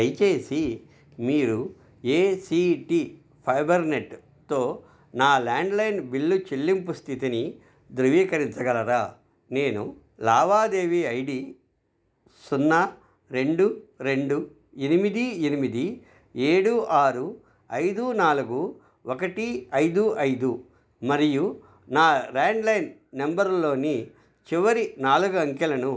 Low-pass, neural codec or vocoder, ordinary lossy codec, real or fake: none; none; none; real